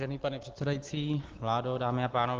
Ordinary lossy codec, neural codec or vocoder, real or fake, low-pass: Opus, 16 kbps; none; real; 7.2 kHz